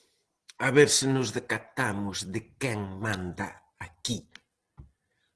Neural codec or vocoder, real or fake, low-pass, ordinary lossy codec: none; real; 10.8 kHz; Opus, 16 kbps